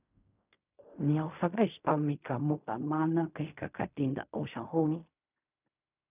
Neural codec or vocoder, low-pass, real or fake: codec, 16 kHz in and 24 kHz out, 0.4 kbps, LongCat-Audio-Codec, fine tuned four codebook decoder; 3.6 kHz; fake